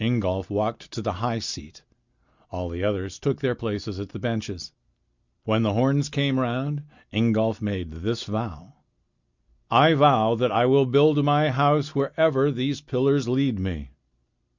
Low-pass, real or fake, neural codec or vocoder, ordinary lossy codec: 7.2 kHz; real; none; Opus, 64 kbps